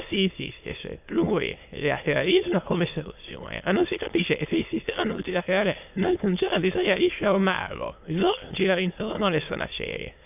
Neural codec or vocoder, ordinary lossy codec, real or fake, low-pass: autoencoder, 22.05 kHz, a latent of 192 numbers a frame, VITS, trained on many speakers; AAC, 32 kbps; fake; 3.6 kHz